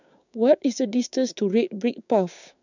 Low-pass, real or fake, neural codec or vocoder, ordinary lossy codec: 7.2 kHz; fake; codec, 16 kHz, 6 kbps, DAC; none